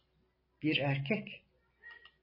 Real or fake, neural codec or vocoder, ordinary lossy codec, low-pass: real; none; MP3, 24 kbps; 5.4 kHz